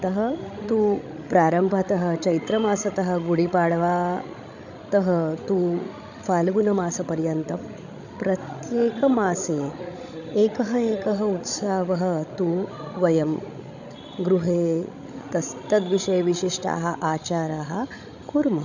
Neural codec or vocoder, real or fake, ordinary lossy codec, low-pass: codec, 16 kHz, 16 kbps, FreqCodec, larger model; fake; none; 7.2 kHz